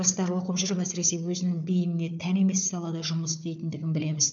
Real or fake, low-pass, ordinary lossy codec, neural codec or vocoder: fake; 7.2 kHz; none; codec, 16 kHz, 4 kbps, FunCodec, trained on Chinese and English, 50 frames a second